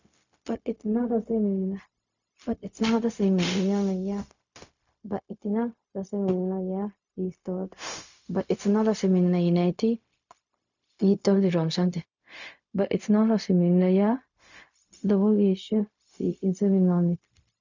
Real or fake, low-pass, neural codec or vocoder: fake; 7.2 kHz; codec, 16 kHz, 0.4 kbps, LongCat-Audio-Codec